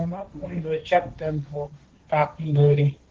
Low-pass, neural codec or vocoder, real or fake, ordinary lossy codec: 7.2 kHz; codec, 16 kHz, 1.1 kbps, Voila-Tokenizer; fake; Opus, 16 kbps